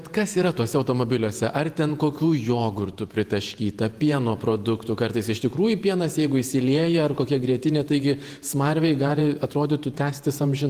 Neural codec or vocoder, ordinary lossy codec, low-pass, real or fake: vocoder, 48 kHz, 128 mel bands, Vocos; Opus, 24 kbps; 14.4 kHz; fake